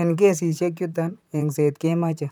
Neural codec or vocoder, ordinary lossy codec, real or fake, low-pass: vocoder, 44.1 kHz, 128 mel bands, Pupu-Vocoder; none; fake; none